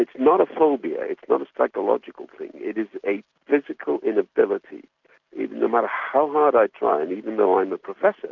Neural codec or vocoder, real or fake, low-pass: none; real; 7.2 kHz